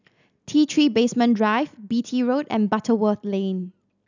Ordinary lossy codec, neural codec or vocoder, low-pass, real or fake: none; none; 7.2 kHz; real